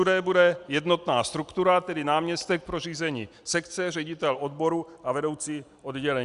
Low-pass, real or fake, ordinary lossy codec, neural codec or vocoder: 10.8 kHz; real; Opus, 64 kbps; none